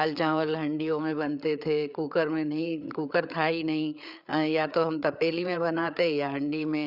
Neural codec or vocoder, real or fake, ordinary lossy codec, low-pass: codec, 16 kHz, 8 kbps, FreqCodec, larger model; fake; none; 5.4 kHz